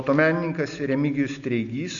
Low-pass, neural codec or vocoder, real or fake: 7.2 kHz; none; real